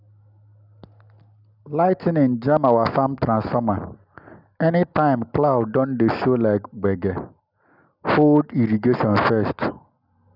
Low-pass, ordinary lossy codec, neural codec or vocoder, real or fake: 5.4 kHz; none; none; real